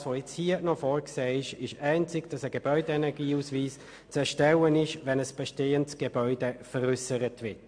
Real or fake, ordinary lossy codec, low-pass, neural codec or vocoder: real; MP3, 64 kbps; 9.9 kHz; none